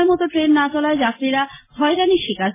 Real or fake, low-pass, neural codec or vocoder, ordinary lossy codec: real; 3.6 kHz; none; MP3, 16 kbps